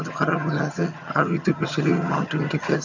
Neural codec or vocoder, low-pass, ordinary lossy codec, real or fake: vocoder, 22.05 kHz, 80 mel bands, HiFi-GAN; 7.2 kHz; none; fake